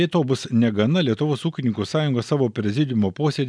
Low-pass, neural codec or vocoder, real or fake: 9.9 kHz; none; real